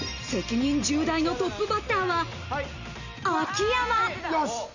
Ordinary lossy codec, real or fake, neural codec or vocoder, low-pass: none; real; none; 7.2 kHz